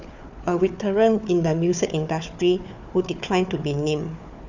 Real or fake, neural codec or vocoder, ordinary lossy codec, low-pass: fake; codec, 16 kHz, 4 kbps, FunCodec, trained on Chinese and English, 50 frames a second; none; 7.2 kHz